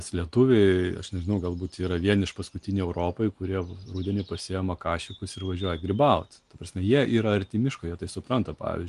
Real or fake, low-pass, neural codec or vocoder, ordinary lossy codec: real; 10.8 kHz; none; Opus, 32 kbps